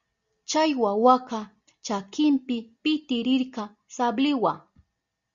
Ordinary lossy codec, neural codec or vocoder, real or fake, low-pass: Opus, 64 kbps; none; real; 7.2 kHz